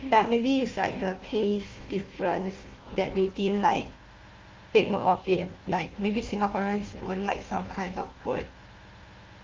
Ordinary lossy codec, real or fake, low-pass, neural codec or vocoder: Opus, 32 kbps; fake; 7.2 kHz; codec, 16 kHz, 1 kbps, FunCodec, trained on Chinese and English, 50 frames a second